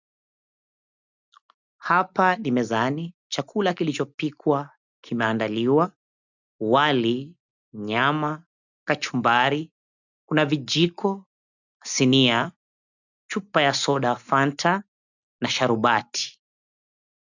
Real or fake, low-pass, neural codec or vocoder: real; 7.2 kHz; none